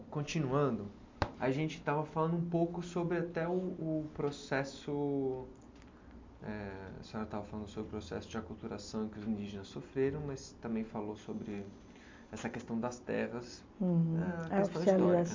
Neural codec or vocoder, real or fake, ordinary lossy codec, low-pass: none; real; MP3, 48 kbps; 7.2 kHz